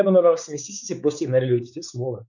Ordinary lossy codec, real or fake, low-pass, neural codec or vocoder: none; fake; 7.2 kHz; codec, 16 kHz, 4 kbps, X-Codec, WavLM features, trained on Multilingual LibriSpeech